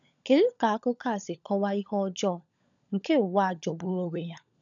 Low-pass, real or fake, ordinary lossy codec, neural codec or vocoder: 7.2 kHz; fake; none; codec, 16 kHz, 16 kbps, FunCodec, trained on LibriTTS, 50 frames a second